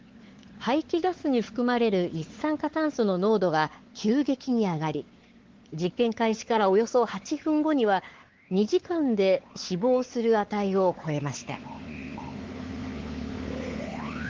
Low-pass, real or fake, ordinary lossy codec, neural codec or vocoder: 7.2 kHz; fake; Opus, 16 kbps; codec, 16 kHz, 4 kbps, X-Codec, WavLM features, trained on Multilingual LibriSpeech